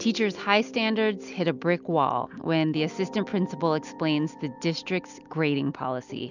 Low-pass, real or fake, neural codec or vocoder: 7.2 kHz; real; none